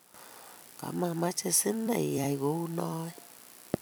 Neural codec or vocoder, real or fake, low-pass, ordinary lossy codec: vocoder, 44.1 kHz, 128 mel bands every 256 samples, BigVGAN v2; fake; none; none